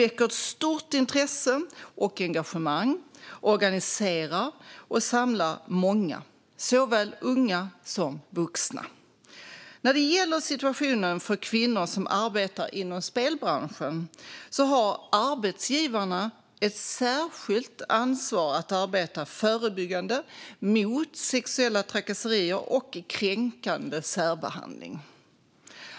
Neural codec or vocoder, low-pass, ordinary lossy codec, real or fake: none; none; none; real